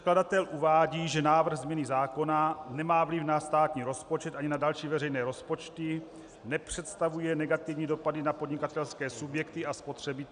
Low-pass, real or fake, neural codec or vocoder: 9.9 kHz; real; none